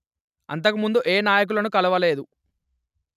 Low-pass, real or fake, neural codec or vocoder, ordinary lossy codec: 14.4 kHz; real; none; none